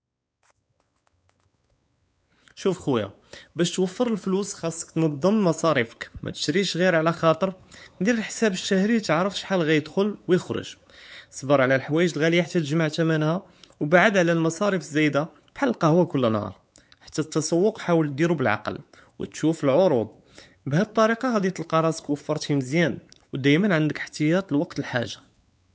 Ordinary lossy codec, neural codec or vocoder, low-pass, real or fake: none; codec, 16 kHz, 4 kbps, X-Codec, WavLM features, trained on Multilingual LibriSpeech; none; fake